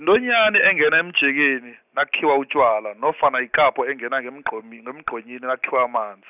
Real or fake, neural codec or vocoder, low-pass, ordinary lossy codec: real; none; 3.6 kHz; none